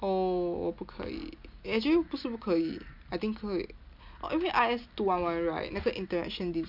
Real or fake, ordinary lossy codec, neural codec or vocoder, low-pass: real; none; none; 5.4 kHz